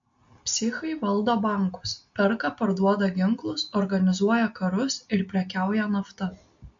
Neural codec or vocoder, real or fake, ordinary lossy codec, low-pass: none; real; MP3, 48 kbps; 7.2 kHz